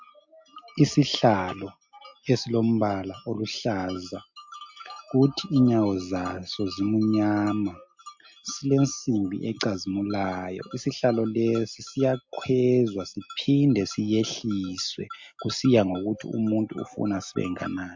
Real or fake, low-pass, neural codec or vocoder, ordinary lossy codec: real; 7.2 kHz; none; MP3, 48 kbps